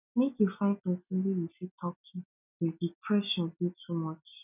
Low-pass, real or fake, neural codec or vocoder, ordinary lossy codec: 3.6 kHz; real; none; none